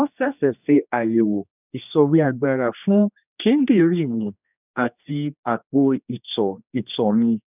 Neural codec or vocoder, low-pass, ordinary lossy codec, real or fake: codec, 16 kHz, 2 kbps, X-Codec, HuBERT features, trained on general audio; 3.6 kHz; none; fake